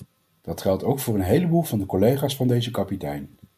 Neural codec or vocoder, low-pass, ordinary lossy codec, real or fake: none; 14.4 kHz; MP3, 96 kbps; real